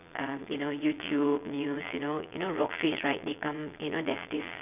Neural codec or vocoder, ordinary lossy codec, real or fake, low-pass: vocoder, 22.05 kHz, 80 mel bands, Vocos; none; fake; 3.6 kHz